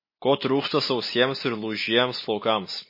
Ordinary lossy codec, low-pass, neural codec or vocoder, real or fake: MP3, 24 kbps; 5.4 kHz; none; real